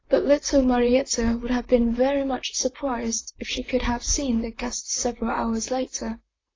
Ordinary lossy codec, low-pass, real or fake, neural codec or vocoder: AAC, 32 kbps; 7.2 kHz; fake; vocoder, 44.1 kHz, 128 mel bands, Pupu-Vocoder